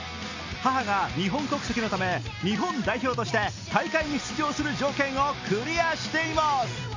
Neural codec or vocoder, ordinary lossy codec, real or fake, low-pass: none; AAC, 48 kbps; real; 7.2 kHz